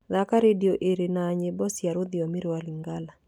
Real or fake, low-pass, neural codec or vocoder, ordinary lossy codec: real; 19.8 kHz; none; none